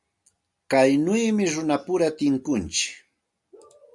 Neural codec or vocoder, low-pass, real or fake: none; 10.8 kHz; real